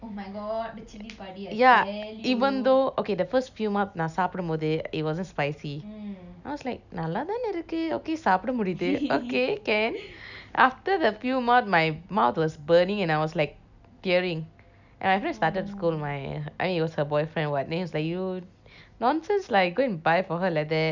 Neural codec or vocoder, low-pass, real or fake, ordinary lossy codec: none; 7.2 kHz; real; none